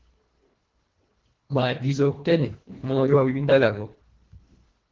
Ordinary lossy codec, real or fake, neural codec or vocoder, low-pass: Opus, 16 kbps; fake; codec, 24 kHz, 1.5 kbps, HILCodec; 7.2 kHz